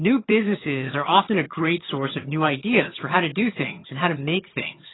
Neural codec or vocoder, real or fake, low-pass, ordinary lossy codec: vocoder, 22.05 kHz, 80 mel bands, HiFi-GAN; fake; 7.2 kHz; AAC, 16 kbps